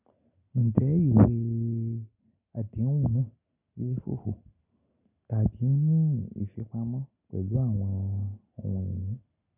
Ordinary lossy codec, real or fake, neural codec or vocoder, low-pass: none; real; none; 3.6 kHz